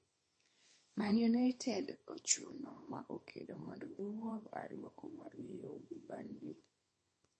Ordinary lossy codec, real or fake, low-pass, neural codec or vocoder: MP3, 32 kbps; fake; 10.8 kHz; codec, 24 kHz, 0.9 kbps, WavTokenizer, small release